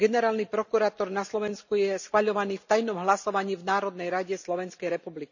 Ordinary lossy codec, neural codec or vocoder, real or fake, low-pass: none; none; real; 7.2 kHz